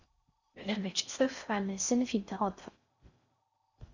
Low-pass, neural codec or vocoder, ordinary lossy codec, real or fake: 7.2 kHz; codec, 16 kHz in and 24 kHz out, 0.6 kbps, FocalCodec, streaming, 4096 codes; Opus, 64 kbps; fake